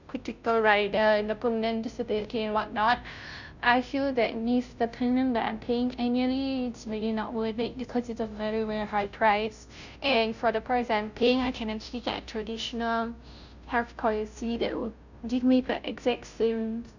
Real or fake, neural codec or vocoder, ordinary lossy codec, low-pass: fake; codec, 16 kHz, 0.5 kbps, FunCodec, trained on Chinese and English, 25 frames a second; none; 7.2 kHz